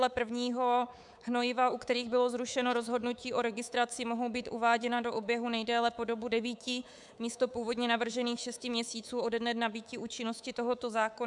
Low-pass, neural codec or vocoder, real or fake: 10.8 kHz; codec, 24 kHz, 3.1 kbps, DualCodec; fake